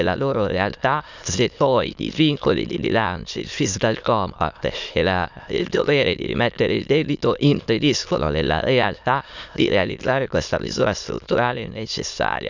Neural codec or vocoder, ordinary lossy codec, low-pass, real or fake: autoencoder, 22.05 kHz, a latent of 192 numbers a frame, VITS, trained on many speakers; none; 7.2 kHz; fake